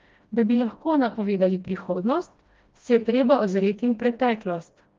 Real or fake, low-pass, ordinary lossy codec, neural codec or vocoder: fake; 7.2 kHz; Opus, 32 kbps; codec, 16 kHz, 1 kbps, FreqCodec, smaller model